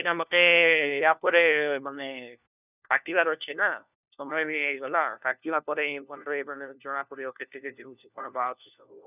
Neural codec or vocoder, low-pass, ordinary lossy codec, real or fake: codec, 16 kHz, 1 kbps, FunCodec, trained on LibriTTS, 50 frames a second; 3.6 kHz; none; fake